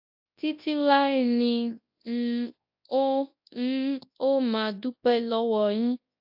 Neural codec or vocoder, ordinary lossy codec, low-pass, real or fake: codec, 24 kHz, 0.9 kbps, WavTokenizer, large speech release; AAC, 48 kbps; 5.4 kHz; fake